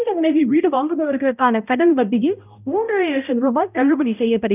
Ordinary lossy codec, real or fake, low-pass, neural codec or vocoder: none; fake; 3.6 kHz; codec, 16 kHz, 0.5 kbps, X-Codec, HuBERT features, trained on balanced general audio